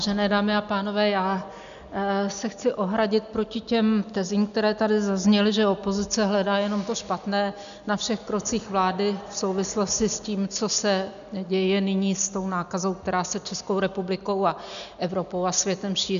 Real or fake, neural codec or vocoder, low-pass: real; none; 7.2 kHz